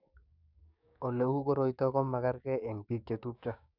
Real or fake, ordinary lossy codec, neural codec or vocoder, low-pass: fake; none; codec, 16 kHz, 6 kbps, DAC; 5.4 kHz